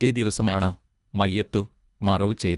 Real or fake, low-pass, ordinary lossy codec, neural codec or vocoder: fake; 10.8 kHz; none; codec, 24 kHz, 1.5 kbps, HILCodec